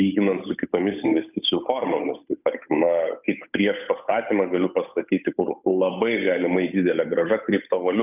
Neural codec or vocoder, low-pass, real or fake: codec, 16 kHz, 8 kbps, FunCodec, trained on Chinese and English, 25 frames a second; 3.6 kHz; fake